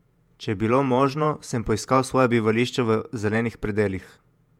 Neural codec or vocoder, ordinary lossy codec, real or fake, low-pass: vocoder, 48 kHz, 128 mel bands, Vocos; MP3, 96 kbps; fake; 19.8 kHz